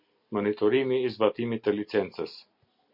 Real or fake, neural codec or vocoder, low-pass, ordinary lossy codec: real; none; 5.4 kHz; MP3, 48 kbps